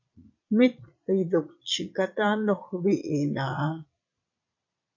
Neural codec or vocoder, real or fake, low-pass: vocoder, 22.05 kHz, 80 mel bands, Vocos; fake; 7.2 kHz